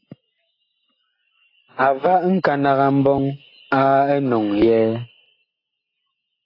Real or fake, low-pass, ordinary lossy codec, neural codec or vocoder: real; 5.4 kHz; AAC, 24 kbps; none